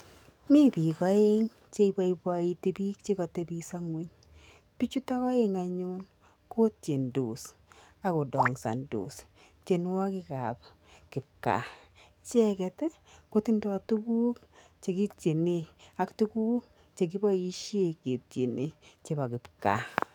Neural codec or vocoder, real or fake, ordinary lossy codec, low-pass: codec, 44.1 kHz, 7.8 kbps, DAC; fake; none; 19.8 kHz